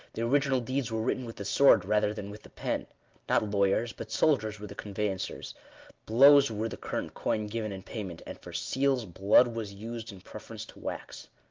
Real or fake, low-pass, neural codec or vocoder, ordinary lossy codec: real; 7.2 kHz; none; Opus, 32 kbps